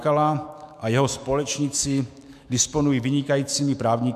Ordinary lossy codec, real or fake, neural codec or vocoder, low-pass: MP3, 96 kbps; fake; autoencoder, 48 kHz, 128 numbers a frame, DAC-VAE, trained on Japanese speech; 14.4 kHz